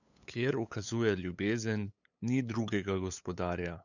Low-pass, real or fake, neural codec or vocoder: 7.2 kHz; fake; codec, 16 kHz, 8 kbps, FunCodec, trained on LibriTTS, 25 frames a second